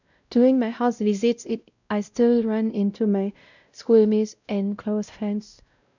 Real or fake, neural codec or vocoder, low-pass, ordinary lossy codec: fake; codec, 16 kHz, 0.5 kbps, X-Codec, WavLM features, trained on Multilingual LibriSpeech; 7.2 kHz; none